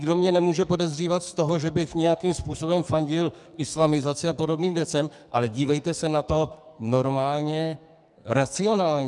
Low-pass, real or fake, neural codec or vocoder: 10.8 kHz; fake; codec, 44.1 kHz, 2.6 kbps, SNAC